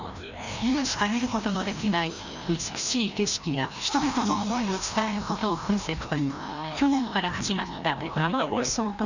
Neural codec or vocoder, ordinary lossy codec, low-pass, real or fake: codec, 16 kHz, 1 kbps, FreqCodec, larger model; none; 7.2 kHz; fake